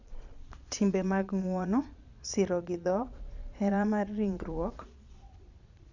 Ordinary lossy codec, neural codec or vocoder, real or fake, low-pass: none; vocoder, 22.05 kHz, 80 mel bands, Vocos; fake; 7.2 kHz